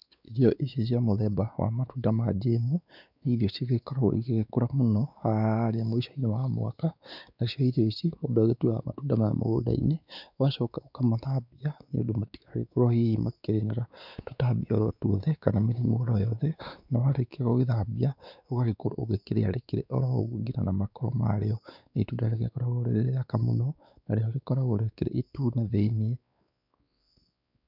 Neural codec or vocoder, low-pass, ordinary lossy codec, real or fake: codec, 16 kHz, 4 kbps, X-Codec, WavLM features, trained on Multilingual LibriSpeech; 5.4 kHz; none; fake